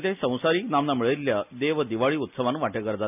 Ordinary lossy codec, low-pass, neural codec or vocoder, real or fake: none; 3.6 kHz; none; real